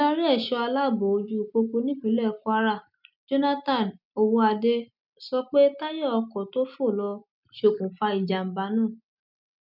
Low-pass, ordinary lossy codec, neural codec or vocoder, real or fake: 5.4 kHz; none; none; real